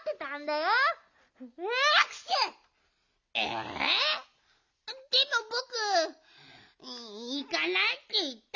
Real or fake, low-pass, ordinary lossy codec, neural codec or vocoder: real; 7.2 kHz; none; none